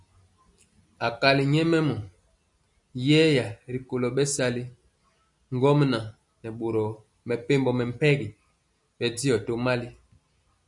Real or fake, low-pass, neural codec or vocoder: real; 10.8 kHz; none